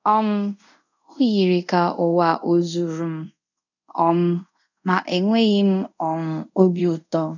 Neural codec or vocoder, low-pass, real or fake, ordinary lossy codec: codec, 24 kHz, 0.5 kbps, DualCodec; 7.2 kHz; fake; none